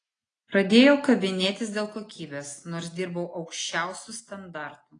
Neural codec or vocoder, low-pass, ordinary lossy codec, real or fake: none; 9.9 kHz; AAC, 32 kbps; real